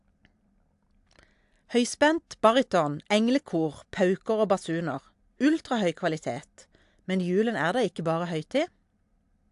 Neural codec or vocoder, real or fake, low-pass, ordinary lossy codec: none; real; 10.8 kHz; AAC, 64 kbps